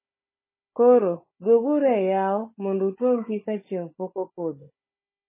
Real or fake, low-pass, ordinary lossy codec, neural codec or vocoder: fake; 3.6 kHz; MP3, 16 kbps; codec, 16 kHz, 16 kbps, FunCodec, trained on Chinese and English, 50 frames a second